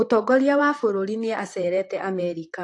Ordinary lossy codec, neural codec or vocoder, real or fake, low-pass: AAC, 48 kbps; vocoder, 44.1 kHz, 128 mel bands, Pupu-Vocoder; fake; 10.8 kHz